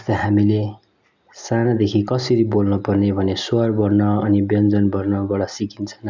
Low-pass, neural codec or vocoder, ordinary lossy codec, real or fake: 7.2 kHz; none; none; real